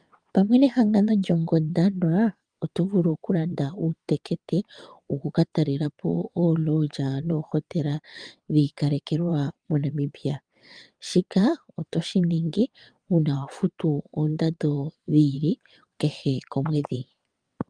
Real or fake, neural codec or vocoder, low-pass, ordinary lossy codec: fake; vocoder, 22.05 kHz, 80 mel bands, WaveNeXt; 9.9 kHz; Opus, 32 kbps